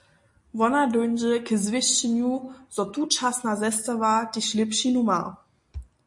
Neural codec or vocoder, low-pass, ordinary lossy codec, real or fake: none; 10.8 kHz; MP3, 48 kbps; real